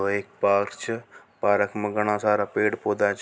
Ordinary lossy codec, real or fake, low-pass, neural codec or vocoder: none; real; none; none